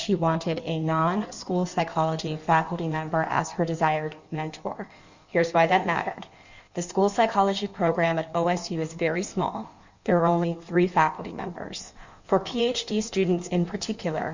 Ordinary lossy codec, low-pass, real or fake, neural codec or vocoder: Opus, 64 kbps; 7.2 kHz; fake; codec, 16 kHz in and 24 kHz out, 1.1 kbps, FireRedTTS-2 codec